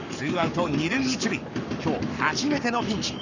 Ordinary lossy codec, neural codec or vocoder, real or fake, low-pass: none; codec, 44.1 kHz, 7.8 kbps, Pupu-Codec; fake; 7.2 kHz